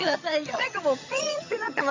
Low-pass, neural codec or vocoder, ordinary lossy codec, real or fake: 7.2 kHz; vocoder, 22.05 kHz, 80 mel bands, HiFi-GAN; MP3, 64 kbps; fake